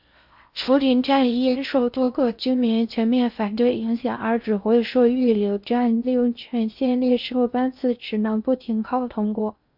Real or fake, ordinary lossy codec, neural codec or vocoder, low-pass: fake; MP3, 48 kbps; codec, 16 kHz in and 24 kHz out, 0.6 kbps, FocalCodec, streaming, 4096 codes; 5.4 kHz